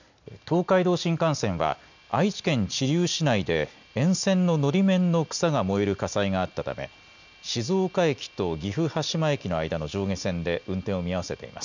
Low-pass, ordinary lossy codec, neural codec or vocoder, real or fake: 7.2 kHz; none; none; real